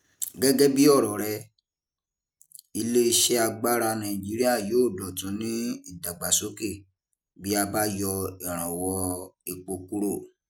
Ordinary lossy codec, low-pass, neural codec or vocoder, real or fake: none; none; none; real